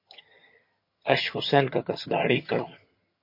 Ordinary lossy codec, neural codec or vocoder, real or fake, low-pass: MP3, 32 kbps; vocoder, 22.05 kHz, 80 mel bands, HiFi-GAN; fake; 5.4 kHz